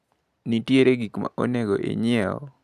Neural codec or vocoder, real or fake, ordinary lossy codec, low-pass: none; real; none; 14.4 kHz